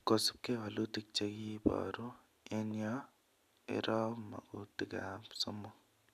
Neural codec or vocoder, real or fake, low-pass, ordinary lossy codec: none; real; 14.4 kHz; none